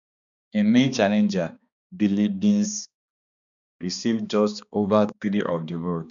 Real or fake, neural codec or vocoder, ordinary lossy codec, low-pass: fake; codec, 16 kHz, 2 kbps, X-Codec, HuBERT features, trained on balanced general audio; none; 7.2 kHz